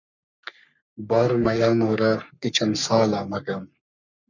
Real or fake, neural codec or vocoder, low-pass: fake; codec, 44.1 kHz, 3.4 kbps, Pupu-Codec; 7.2 kHz